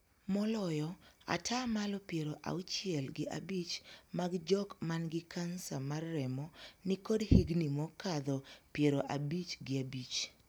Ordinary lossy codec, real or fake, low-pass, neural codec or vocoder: none; real; none; none